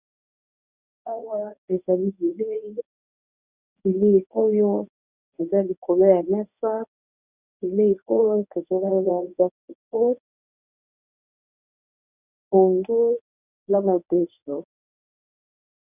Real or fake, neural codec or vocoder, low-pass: fake; codec, 24 kHz, 0.9 kbps, WavTokenizer, medium speech release version 1; 3.6 kHz